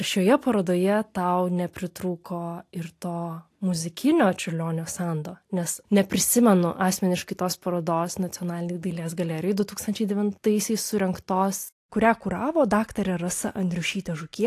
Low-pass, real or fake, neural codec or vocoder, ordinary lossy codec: 14.4 kHz; real; none; AAC, 64 kbps